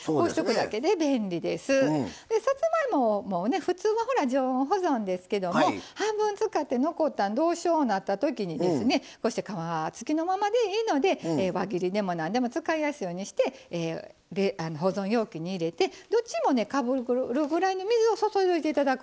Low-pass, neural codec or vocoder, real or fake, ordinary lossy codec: none; none; real; none